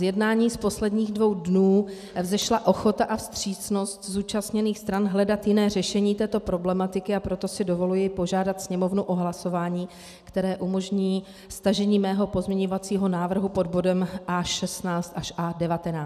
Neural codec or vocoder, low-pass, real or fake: none; 14.4 kHz; real